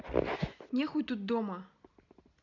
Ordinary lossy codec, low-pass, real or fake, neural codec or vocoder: none; 7.2 kHz; real; none